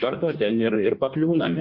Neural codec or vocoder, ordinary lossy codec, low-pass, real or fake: codec, 16 kHz in and 24 kHz out, 1.1 kbps, FireRedTTS-2 codec; Opus, 64 kbps; 5.4 kHz; fake